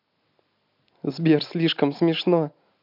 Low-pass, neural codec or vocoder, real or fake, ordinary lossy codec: 5.4 kHz; none; real; MP3, 48 kbps